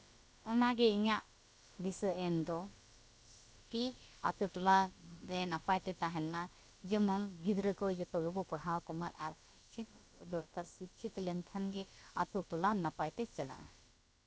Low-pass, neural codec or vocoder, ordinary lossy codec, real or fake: none; codec, 16 kHz, about 1 kbps, DyCAST, with the encoder's durations; none; fake